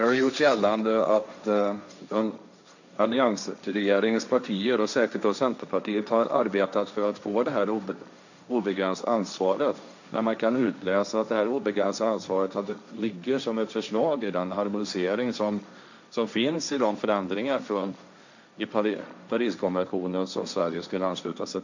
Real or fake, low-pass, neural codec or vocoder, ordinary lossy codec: fake; 7.2 kHz; codec, 16 kHz, 1.1 kbps, Voila-Tokenizer; none